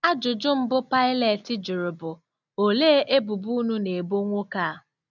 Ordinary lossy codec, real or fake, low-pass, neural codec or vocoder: none; real; 7.2 kHz; none